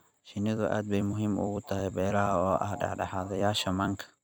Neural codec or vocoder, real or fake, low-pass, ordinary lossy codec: vocoder, 44.1 kHz, 128 mel bands every 256 samples, BigVGAN v2; fake; none; none